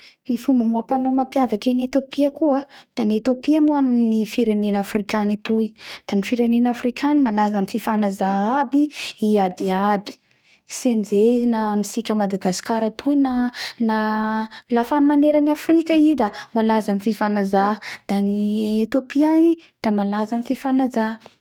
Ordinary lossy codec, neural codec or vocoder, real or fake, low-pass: none; codec, 44.1 kHz, 2.6 kbps, DAC; fake; 19.8 kHz